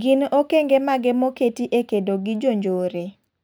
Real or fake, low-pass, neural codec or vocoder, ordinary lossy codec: real; none; none; none